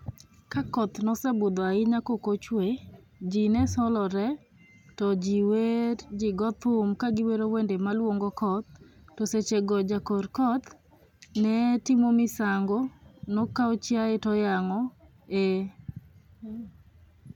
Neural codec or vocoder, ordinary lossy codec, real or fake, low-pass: none; none; real; 19.8 kHz